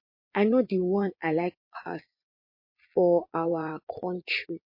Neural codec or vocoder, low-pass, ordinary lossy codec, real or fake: vocoder, 22.05 kHz, 80 mel bands, Vocos; 5.4 kHz; MP3, 32 kbps; fake